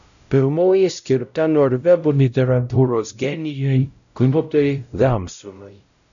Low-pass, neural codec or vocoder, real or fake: 7.2 kHz; codec, 16 kHz, 0.5 kbps, X-Codec, WavLM features, trained on Multilingual LibriSpeech; fake